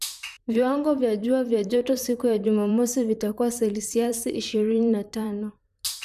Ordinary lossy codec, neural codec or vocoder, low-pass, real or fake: none; vocoder, 44.1 kHz, 128 mel bands, Pupu-Vocoder; 14.4 kHz; fake